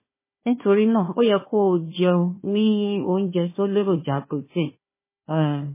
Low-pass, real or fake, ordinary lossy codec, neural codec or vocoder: 3.6 kHz; fake; MP3, 16 kbps; codec, 16 kHz, 1 kbps, FunCodec, trained on Chinese and English, 50 frames a second